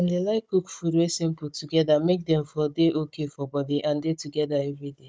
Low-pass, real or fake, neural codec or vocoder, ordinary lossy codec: none; fake; codec, 16 kHz, 16 kbps, FunCodec, trained on Chinese and English, 50 frames a second; none